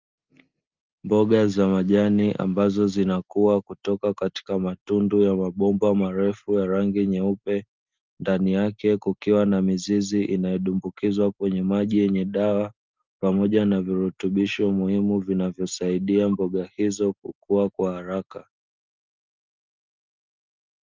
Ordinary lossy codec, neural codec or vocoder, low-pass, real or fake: Opus, 24 kbps; none; 7.2 kHz; real